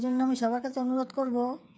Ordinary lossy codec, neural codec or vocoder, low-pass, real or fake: none; codec, 16 kHz, 8 kbps, FreqCodec, smaller model; none; fake